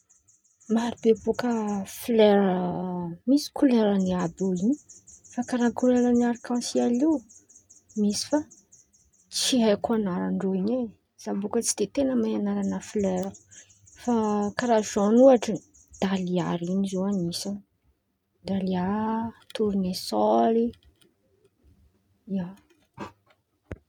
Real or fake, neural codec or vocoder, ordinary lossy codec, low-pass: real; none; none; 19.8 kHz